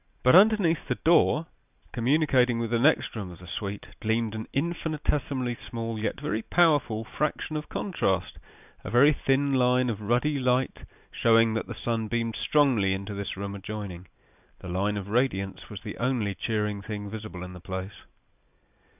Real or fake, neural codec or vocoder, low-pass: real; none; 3.6 kHz